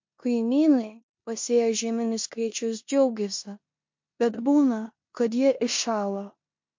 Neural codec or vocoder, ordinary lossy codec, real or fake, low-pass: codec, 16 kHz in and 24 kHz out, 0.9 kbps, LongCat-Audio-Codec, four codebook decoder; MP3, 48 kbps; fake; 7.2 kHz